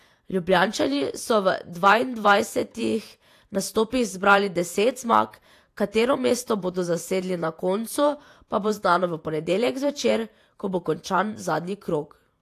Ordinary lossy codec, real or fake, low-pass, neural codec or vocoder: AAC, 64 kbps; fake; 14.4 kHz; vocoder, 48 kHz, 128 mel bands, Vocos